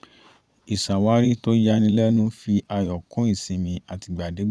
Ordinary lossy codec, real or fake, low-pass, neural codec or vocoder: none; fake; none; vocoder, 22.05 kHz, 80 mel bands, Vocos